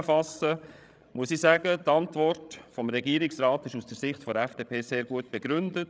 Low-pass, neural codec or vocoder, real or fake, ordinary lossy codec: none; codec, 16 kHz, 16 kbps, FreqCodec, larger model; fake; none